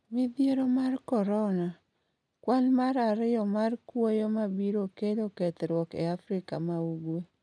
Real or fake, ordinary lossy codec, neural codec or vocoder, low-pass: real; none; none; none